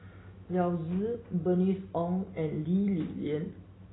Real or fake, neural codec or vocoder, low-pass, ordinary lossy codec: real; none; 7.2 kHz; AAC, 16 kbps